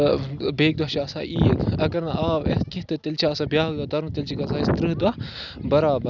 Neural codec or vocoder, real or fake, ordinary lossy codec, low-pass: none; real; none; 7.2 kHz